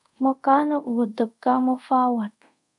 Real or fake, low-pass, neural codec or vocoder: fake; 10.8 kHz; codec, 24 kHz, 0.5 kbps, DualCodec